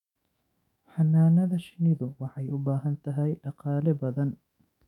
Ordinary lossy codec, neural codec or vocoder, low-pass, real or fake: none; autoencoder, 48 kHz, 128 numbers a frame, DAC-VAE, trained on Japanese speech; 19.8 kHz; fake